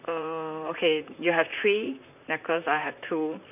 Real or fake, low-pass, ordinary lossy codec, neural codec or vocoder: fake; 3.6 kHz; none; vocoder, 44.1 kHz, 128 mel bands, Pupu-Vocoder